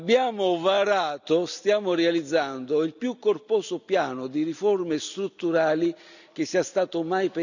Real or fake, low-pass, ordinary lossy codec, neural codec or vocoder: real; 7.2 kHz; none; none